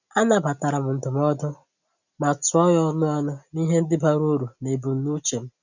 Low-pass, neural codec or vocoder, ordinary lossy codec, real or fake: 7.2 kHz; none; none; real